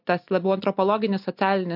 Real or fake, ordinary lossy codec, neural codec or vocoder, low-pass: real; MP3, 48 kbps; none; 5.4 kHz